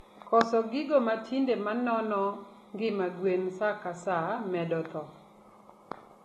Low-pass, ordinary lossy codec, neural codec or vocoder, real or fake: 19.8 kHz; AAC, 32 kbps; none; real